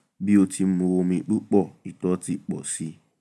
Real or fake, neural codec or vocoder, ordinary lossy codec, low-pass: real; none; none; none